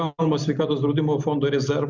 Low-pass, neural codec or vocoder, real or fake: 7.2 kHz; none; real